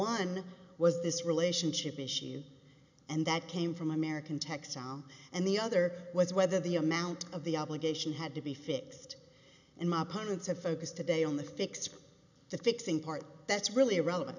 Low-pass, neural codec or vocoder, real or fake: 7.2 kHz; none; real